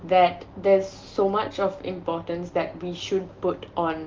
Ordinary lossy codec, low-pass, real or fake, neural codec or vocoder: Opus, 32 kbps; 7.2 kHz; real; none